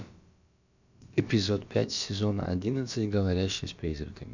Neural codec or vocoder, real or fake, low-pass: codec, 16 kHz, about 1 kbps, DyCAST, with the encoder's durations; fake; 7.2 kHz